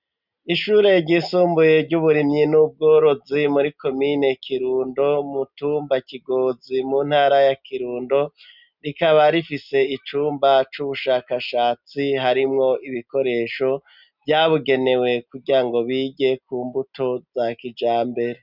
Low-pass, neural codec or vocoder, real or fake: 5.4 kHz; none; real